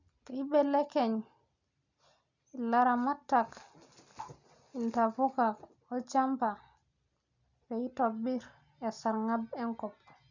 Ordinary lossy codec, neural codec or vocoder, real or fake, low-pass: none; none; real; 7.2 kHz